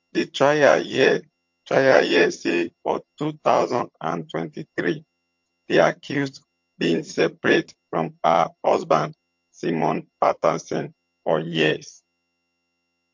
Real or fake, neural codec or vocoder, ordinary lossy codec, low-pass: fake; vocoder, 22.05 kHz, 80 mel bands, HiFi-GAN; MP3, 48 kbps; 7.2 kHz